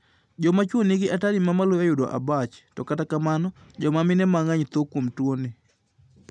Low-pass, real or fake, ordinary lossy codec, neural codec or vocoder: none; real; none; none